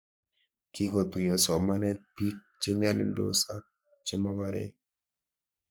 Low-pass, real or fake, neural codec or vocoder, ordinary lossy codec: none; fake; codec, 44.1 kHz, 3.4 kbps, Pupu-Codec; none